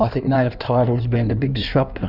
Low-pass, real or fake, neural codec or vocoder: 5.4 kHz; fake; codec, 16 kHz in and 24 kHz out, 1.1 kbps, FireRedTTS-2 codec